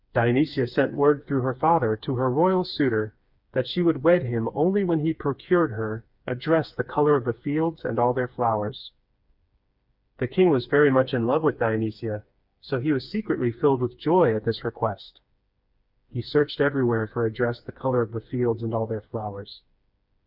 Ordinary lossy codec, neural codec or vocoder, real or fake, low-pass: Opus, 64 kbps; codec, 16 kHz, 4 kbps, FreqCodec, smaller model; fake; 5.4 kHz